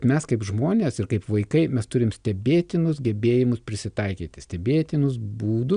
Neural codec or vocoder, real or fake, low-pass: none; real; 9.9 kHz